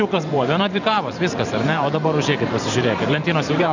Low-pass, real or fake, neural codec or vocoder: 7.2 kHz; fake; vocoder, 44.1 kHz, 128 mel bands every 512 samples, BigVGAN v2